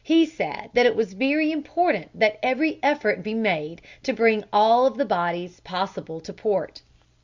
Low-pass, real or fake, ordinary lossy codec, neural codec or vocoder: 7.2 kHz; real; Opus, 64 kbps; none